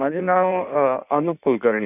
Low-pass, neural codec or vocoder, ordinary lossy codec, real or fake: 3.6 kHz; codec, 16 kHz in and 24 kHz out, 1.1 kbps, FireRedTTS-2 codec; none; fake